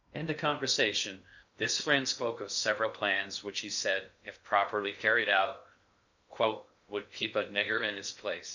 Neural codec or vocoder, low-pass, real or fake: codec, 16 kHz in and 24 kHz out, 0.6 kbps, FocalCodec, streaming, 2048 codes; 7.2 kHz; fake